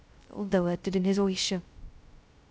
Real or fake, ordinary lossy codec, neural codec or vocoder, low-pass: fake; none; codec, 16 kHz, 0.2 kbps, FocalCodec; none